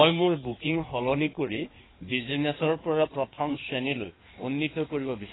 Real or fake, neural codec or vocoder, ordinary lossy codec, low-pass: fake; codec, 16 kHz in and 24 kHz out, 1.1 kbps, FireRedTTS-2 codec; AAC, 16 kbps; 7.2 kHz